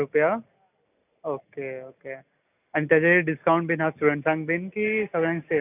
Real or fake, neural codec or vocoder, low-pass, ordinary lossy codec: real; none; 3.6 kHz; none